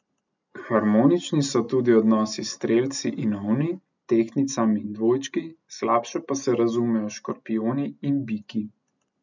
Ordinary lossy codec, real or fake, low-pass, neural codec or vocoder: none; real; 7.2 kHz; none